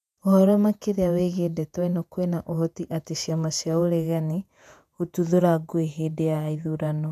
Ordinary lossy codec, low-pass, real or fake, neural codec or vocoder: none; 14.4 kHz; fake; vocoder, 48 kHz, 128 mel bands, Vocos